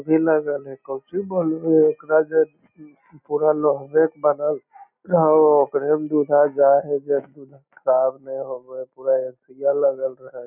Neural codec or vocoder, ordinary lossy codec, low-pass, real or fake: none; none; 3.6 kHz; real